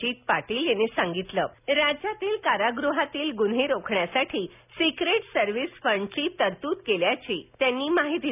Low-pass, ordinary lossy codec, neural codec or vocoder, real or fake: 3.6 kHz; none; none; real